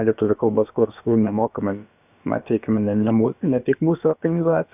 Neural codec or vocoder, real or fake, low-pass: codec, 16 kHz, about 1 kbps, DyCAST, with the encoder's durations; fake; 3.6 kHz